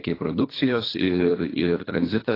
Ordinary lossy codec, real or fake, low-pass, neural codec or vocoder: AAC, 24 kbps; fake; 5.4 kHz; codec, 24 kHz, 3 kbps, HILCodec